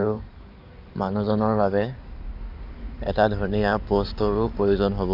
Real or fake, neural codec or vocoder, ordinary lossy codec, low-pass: fake; codec, 16 kHz in and 24 kHz out, 2.2 kbps, FireRedTTS-2 codec; none; 5.4 kHz